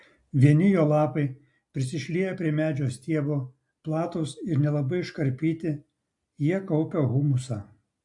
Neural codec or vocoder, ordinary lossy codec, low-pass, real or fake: none; AAC, 64 kbps; 10.8 kHz; real